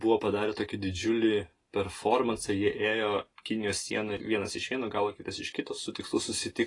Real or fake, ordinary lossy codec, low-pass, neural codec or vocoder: real; AAC, 32 kbps; 10.8 kHz; none